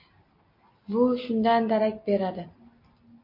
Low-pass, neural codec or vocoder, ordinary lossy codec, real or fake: 5.4 kHz; none; MP3, 32 kbps; real